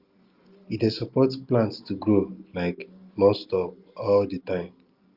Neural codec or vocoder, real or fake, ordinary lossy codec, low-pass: none; real; Opus, 24 kbps; 5.4 kHz